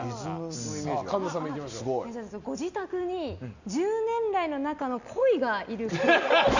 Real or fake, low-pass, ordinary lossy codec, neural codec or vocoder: real; 7.2 kHz; none; none